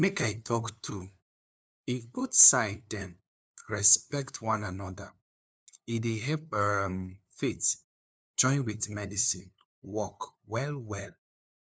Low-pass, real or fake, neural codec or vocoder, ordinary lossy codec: none; fake; codec, 16 kHz, 2 kbps, FunCodec, trained on LibriTTS, 25 frames a second; none